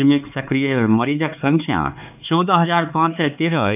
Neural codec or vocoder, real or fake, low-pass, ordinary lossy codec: codec, 16 kHz, 4 kbps, X-Codec, HuBERT features, trained on LibriSpeech; fake; 3.6 kHz; none